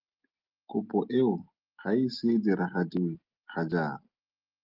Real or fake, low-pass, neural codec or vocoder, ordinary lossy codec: real; 5.4 kHz; none; Opus, 32 kbps